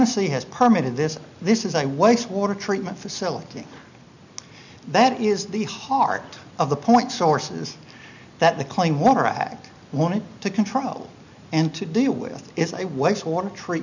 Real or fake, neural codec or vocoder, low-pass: real; none; 7.2 kHz